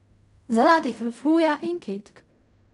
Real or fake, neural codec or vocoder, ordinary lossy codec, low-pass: fake; codec, 16 kHz in and 24 kHz out, 0.4 kbps, LongCat-Audio-Codec, fine tuned four codebook decoder; none; 10.8 kHz